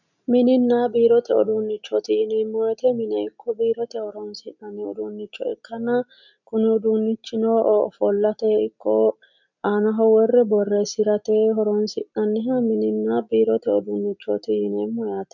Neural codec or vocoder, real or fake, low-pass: none; real; 7.2 kHz